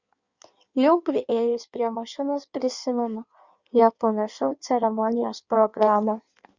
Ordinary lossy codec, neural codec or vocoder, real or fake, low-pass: Opus, 64 kbps; codec, 16 kHz in and 24 kHz out, 1.1 kbps, FireRedTTS-2 codec; fake; 7.2 kHz